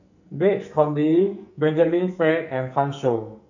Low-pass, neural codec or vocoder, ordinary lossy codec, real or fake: 7.2 kHz; codec, 44.1 kHz, 2.6 kbps, SNAC; none; fake